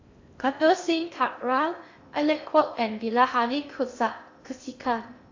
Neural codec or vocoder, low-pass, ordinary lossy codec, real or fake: codec, 16 kHz in and 24 kHz out, 0.6 kbps, FocalCodec, streaming, 2048 codes; 7.2 kHz; AAC, 48 kbps; fake